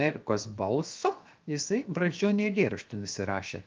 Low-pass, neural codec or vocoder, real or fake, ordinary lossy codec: 7.2 kHz; codec, 16 kHz, 0.7 kbps, FocalCodec; fake; Opus, 24 kbps